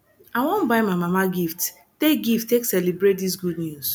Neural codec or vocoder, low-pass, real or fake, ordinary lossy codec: none; none; real; none